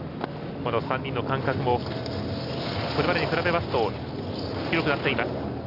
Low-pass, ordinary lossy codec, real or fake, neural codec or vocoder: 5.4 kHz; none; real; none